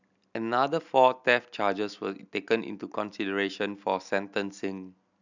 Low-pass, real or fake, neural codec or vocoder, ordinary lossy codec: 7.2 kHz; real; none; none